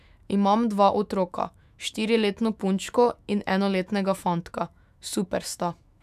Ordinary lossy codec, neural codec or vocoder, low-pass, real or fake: none; autoencoder, 48 kHz, 128 numbers a frame, DAC-VAE, trained on Japanese speech; 14.4 kHz; fake